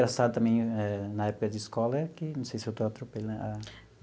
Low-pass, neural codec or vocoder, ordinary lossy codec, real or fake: none; none; none; real